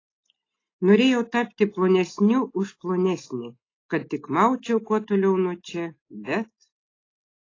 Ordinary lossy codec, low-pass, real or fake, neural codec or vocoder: AAC, 32 kbps; 7.2 kHz; real; none